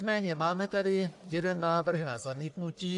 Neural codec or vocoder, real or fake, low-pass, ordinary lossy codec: codec, 44.1 kHz, 1.7 kbps, Pupu-Codec; fake; 10.8 kHz; AAC, 64 kbps